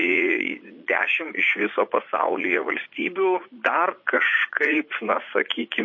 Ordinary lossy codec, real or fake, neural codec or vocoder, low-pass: MP3, 32 kbps; fake; vocoder, 44.1 kHz, 80 mel bands, Vocos; 7.2 kHz